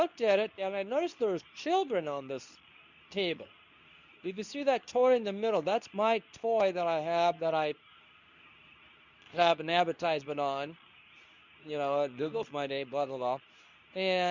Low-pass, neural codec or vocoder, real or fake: 7.2 kHz; codec, 24 kHz, 0.9 kbps, WavTokenizer, medium speech release version 2; fake